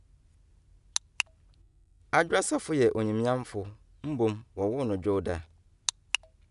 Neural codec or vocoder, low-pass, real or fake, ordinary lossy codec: none; 10.8 kHz; real; none